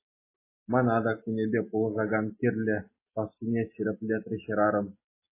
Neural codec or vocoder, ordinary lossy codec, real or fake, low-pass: none; MP3, 16 kbps; real; 3.6 kHz